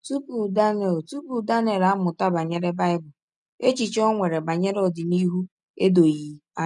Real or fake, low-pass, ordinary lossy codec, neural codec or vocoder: real; 10.8 kHz; none; none